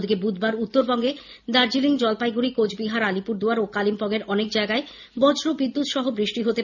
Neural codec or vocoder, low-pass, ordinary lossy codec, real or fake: none; 7.2 kHz; none; real